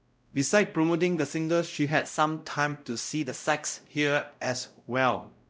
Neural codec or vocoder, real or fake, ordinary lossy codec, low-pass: codec, 16 kHz, 0.5 kbps, X-Codec, WavLM features, trained on Multilingual LibriSpeech; fake; none; none